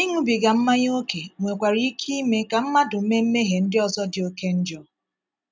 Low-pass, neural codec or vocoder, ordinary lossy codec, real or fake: none; none; none; real